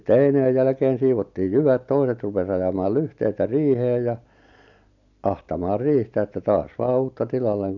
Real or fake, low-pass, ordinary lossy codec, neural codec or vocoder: real; 7.2 kHz; none; none